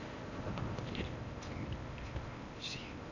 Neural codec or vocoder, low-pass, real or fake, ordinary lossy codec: codec, 16 kHz in and 24 kHz out, 0.8 kbps, FocalCodec, streaming, 65536 codes; 7.2 kHz; fake; none